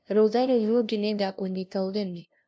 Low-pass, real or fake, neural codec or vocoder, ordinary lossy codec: none; fake; codec, 16 kHz, 0.5 kbps, FunCodec, trained on LibriTTS, 25 frames a second; none